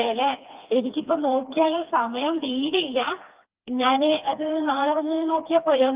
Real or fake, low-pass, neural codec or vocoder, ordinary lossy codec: fake; 3.6 kHz; codec, 16 kHz, 2 kbps, FreqCodec, smaller model; Opus, 24 kbps